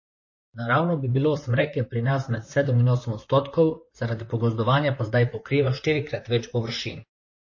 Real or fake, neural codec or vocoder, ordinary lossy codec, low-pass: fake; vocoder, 44.1 kHz, 128 mel bands, Pupu-Vocoder; MP3, 32 kbps; 7.2 kHz